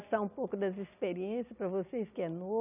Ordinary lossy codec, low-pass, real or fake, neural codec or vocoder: none; 3.6 kHz; real; none